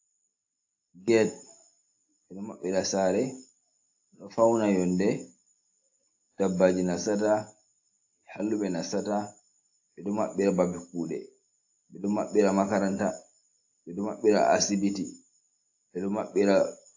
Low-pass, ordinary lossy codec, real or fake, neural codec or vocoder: 7.2 kHz; AAC, 48 kbps; real; none